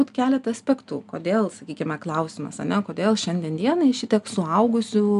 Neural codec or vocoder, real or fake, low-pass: none; real; 10.8 kHz